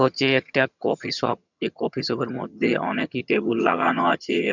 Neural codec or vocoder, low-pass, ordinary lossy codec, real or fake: vocoder, 22.05 kHz, 80 mel bands, HiFi-GAN; 7.2 kHz; none; fake